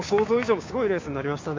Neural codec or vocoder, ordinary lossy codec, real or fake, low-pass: codec, 16 kHz, 6 kbps, DAC; MP3, 48 kbps; fake; 7.2 kHz